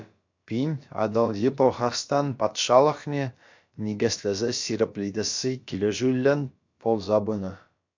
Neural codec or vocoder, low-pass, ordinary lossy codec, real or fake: codec, 16 kHz, about 1 kbps, DyCAST, with the encoder's durations; 7.2 kHz; MP3, 64 kbps; fake